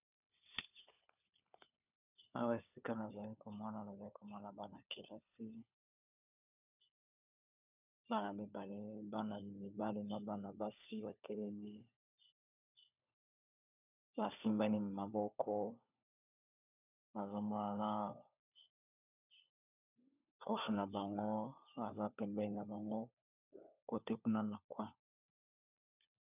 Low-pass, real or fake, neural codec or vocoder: 3.6 kHz; fake; codec, 16 kHz, 4 kbps, FunCodec, trained on LibriTTS, 50 frames a second